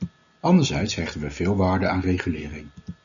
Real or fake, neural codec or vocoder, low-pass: real; none; 7.2 kHz